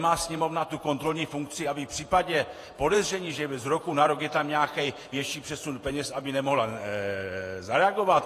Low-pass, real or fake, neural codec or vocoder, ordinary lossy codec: 14.4 kHz; fake; vocoder, 48 kHz, 128 mel bands, Vocos; AAC, 48 kbps